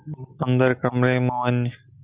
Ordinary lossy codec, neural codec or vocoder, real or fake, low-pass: Opus, 64 kbps; none; real; 3.6 kHz